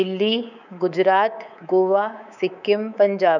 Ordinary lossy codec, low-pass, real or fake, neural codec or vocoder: none; 7.2 kHz; fake; codec, 24 kHz, 3.1 kbps, DualCodec